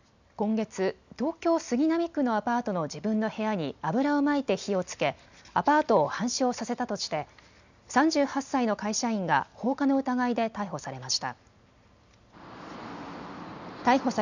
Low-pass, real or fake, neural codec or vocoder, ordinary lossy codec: 7.2 kHz; real; none; none